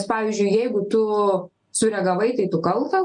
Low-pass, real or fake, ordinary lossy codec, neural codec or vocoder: 9.9 kHz; real; MP3, 96 kbps; none